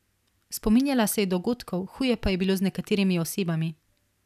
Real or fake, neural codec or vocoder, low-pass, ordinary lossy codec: real; none; 14.4 kHz; none